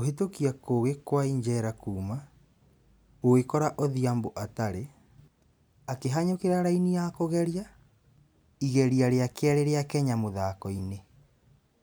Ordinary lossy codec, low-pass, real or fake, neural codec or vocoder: none; none; real; none